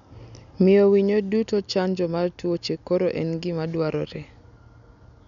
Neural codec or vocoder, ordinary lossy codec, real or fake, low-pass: none; none; real; 7.2 kHz